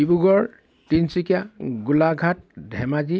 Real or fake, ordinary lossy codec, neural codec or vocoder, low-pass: real; none; none; none